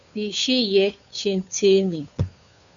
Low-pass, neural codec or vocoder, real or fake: 7.2 kHz; codec, 16 kHz, 2 kbps, FunCodec, trained on Chinese and English, 25 frames a second; fake